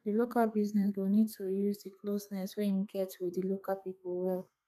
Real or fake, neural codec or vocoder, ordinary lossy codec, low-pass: fake; codec, 32 kHz, 1.9 kbps, SNAC; none; 14.4 kHz